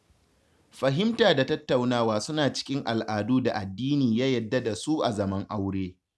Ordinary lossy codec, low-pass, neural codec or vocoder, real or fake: none; none; none; real